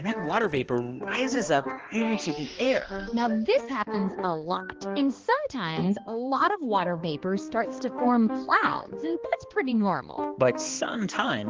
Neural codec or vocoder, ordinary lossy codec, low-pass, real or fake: codec, 16 kHz, 2 kbps, X-Codec, HuBERT features, trained on balanced general audio; Opus, 16 kbps; 7.2 kHz; fake